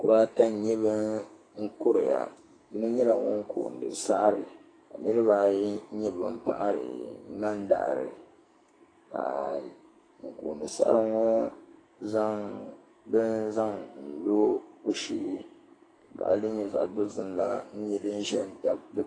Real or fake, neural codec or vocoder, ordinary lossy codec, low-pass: fake; codec, 44.1 kHz, 2.6 kbps, SNAC; AAC, 32 kbps; 9.9 kHz